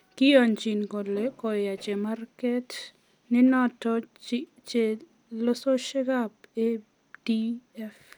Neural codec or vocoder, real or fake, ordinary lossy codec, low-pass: none; real; none; 19.8 kHz